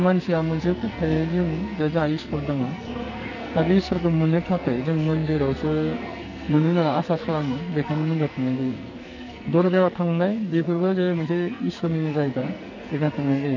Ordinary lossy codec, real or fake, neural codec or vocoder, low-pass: none; fake; codec, 44.1 kHz, 2.6 kbps, SNAC; 7.2 kHz